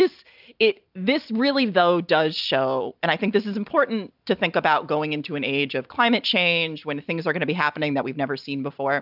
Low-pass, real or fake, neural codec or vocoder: 5.4 kHz; real; none